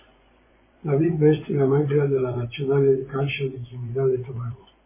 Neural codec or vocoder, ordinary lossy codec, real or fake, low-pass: vocoder, 24 kHz, 100 mel bands, Vocos; MP3, 16 kbps; fake; 3.6 kHz